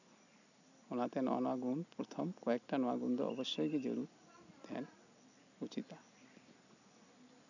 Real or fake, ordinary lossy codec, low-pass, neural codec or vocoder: real; none; 7.2 kHz; none